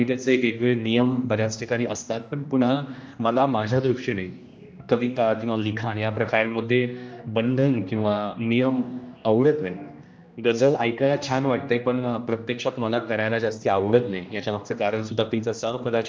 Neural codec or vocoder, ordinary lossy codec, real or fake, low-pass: codec, 16 kHz, 1 kbps, X-Codec, HuBERT features, trained on general audio; none; fake; none